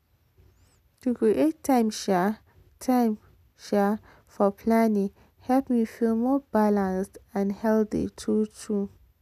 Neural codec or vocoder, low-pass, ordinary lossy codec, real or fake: none; 14.4 kHz; none; real